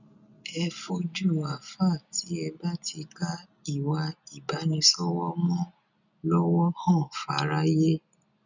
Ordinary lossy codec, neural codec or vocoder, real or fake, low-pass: none; none; real; 7.2 kHz